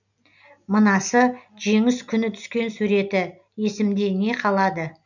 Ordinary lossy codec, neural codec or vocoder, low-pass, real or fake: none; none; 7.2 kHz; real